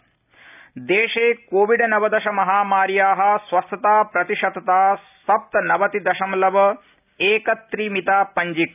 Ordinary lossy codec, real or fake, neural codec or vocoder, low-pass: none; real; none; 3.6 kHz